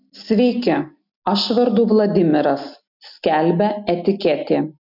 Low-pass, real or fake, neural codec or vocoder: 5.4 kHz; real; none